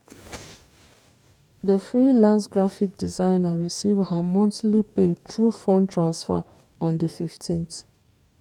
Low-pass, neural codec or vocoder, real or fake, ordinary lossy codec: 19.8 kHz; codec, 44.1 kHz, 2.6 kbps, DAC; fake; none